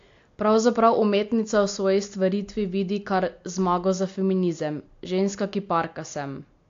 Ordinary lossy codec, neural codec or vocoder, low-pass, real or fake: AAC, 48 kbps; none; 7.2 kHz; real